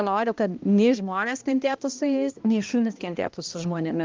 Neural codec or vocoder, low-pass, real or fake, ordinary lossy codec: codec, 16 kHz, 1 kbps, X-Codec, HuBERT features, trained on balanced general audio; 7.2 kHz; fake; Opus, 32 kbps